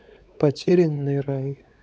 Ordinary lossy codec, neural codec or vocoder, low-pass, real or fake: none; codec, 16 kHz, 4 kbps, X-Codec, WavLM features, trained on Multilingual LibriSpeech; none; fake